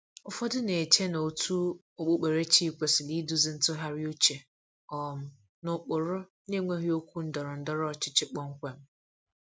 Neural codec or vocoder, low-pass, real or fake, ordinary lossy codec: none; none; real; none